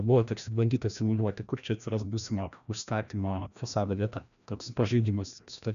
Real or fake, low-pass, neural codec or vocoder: fake; 7.2 kHz; codec, 16 kHz, 1 kbps, FreqCodec, larger model